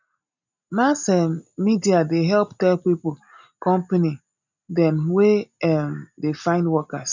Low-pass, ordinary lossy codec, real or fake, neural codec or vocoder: 7.2 kHz; none; real; none